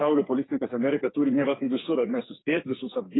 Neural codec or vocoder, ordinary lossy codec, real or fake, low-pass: codec, 44.1 kHz, 2.6 kbps, SNAC; AAC, 16 kbps; fake; 7.2 kHz